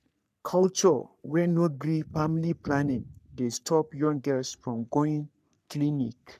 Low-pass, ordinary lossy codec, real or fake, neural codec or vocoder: 14.4 kHz; none; fake; codec, 44.1 kHz, 3.4 kbps, Pupu-Codec